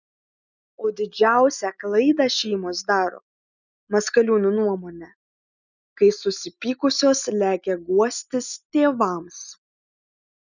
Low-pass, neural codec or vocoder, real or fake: 7.2 kHz; none; real